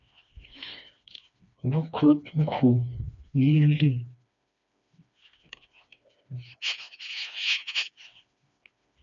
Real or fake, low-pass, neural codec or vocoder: fake; 7.2 kHz; codec, 16 kHz, 2 kbps, FreqCodec, smaller model